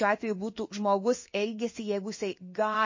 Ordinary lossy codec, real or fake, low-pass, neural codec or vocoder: MP3, 32 kbps; fake; 7.2 kHz; codec, 16 kHz in and 24 kHz out, 1 kbps, XY-Tokenizer